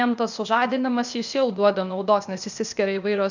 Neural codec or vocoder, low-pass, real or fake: codec, 16 kHz, 0.8 kbps, ZipCodec; 7.2 kHz; fake